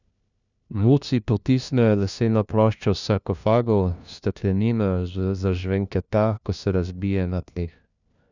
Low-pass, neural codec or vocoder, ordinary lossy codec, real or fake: 7.2 kHz; codec, 16 kHz, 1 kbps, FunCodec, trained on LibriTTS, 50 frames a second; none; fake